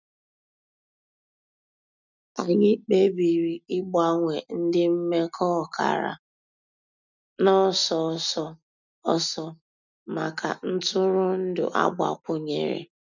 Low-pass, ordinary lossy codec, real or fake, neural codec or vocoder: 7.2 kHz; none; real; none